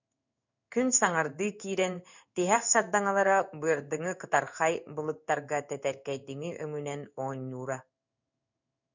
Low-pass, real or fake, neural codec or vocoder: 7.2 kHz; fake; codec, 16 kHz in and 24 kHz out, 1 kbps, XY-Tokenizer